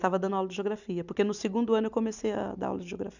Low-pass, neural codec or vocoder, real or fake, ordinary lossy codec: 7.2 kHz; none; real; none